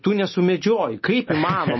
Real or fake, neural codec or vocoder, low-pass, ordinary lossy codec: real; none; 7.2 kHz; MP3, 24 kbps